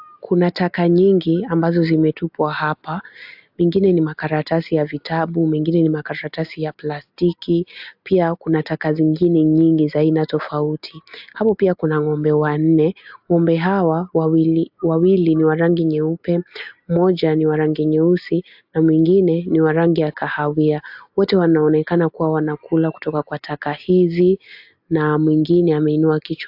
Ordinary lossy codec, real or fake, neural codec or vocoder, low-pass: AAC, 48 kbps; real; none; 5.4 kHz